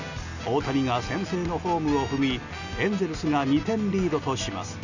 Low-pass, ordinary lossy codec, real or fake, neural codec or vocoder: 7.2 kHz; none; real; none